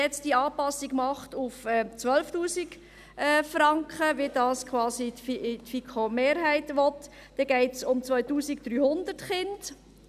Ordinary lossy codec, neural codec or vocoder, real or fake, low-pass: none; none; real; 14.4 kHz